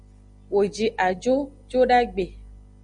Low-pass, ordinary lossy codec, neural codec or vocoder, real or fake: 9.9 kHz; Opus, 64 kbps; none; real